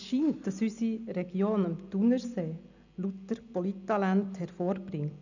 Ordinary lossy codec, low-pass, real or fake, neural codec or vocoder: none; 7.2 kHz; real; none